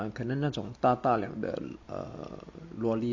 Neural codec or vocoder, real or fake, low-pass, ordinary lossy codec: none; real; 7.2 kHz; MP3, 48 kbps